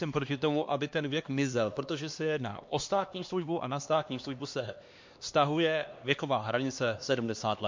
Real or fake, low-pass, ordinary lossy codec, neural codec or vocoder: fake; 7.2 kHz; MP3, 48 kbps; codec, 16 kHz, 2 kbps, X-Codec, HuBERT features, trained on LibriSpeech